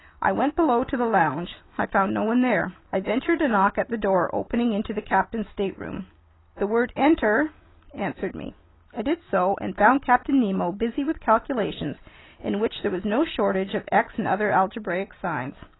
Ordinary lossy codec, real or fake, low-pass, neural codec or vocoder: AAC, 16 kbps; real; 7.2 kHz; none